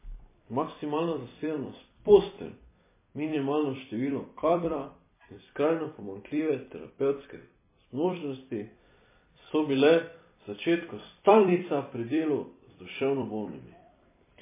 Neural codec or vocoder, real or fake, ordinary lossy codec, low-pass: vocoder, 44.1 kHz, 128 mel bands every 256 samples, BigVGAN v2; fake; MP3, 16 kbps; 3.6 kHz